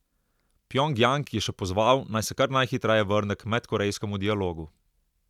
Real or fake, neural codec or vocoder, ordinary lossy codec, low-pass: real; none; none; 19.8 kHz